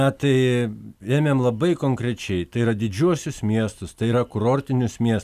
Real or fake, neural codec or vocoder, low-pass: real; none; 14.4 kHz